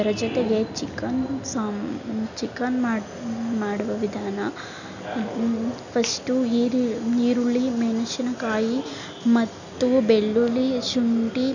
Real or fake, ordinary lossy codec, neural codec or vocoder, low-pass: real; none; none; 7.2 kHz